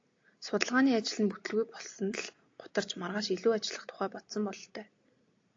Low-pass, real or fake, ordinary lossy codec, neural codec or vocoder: 7.2 kHz; real; AAC, 48 kbps; none